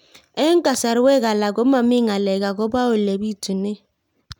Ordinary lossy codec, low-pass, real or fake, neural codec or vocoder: none; 19.8 kHz; real; none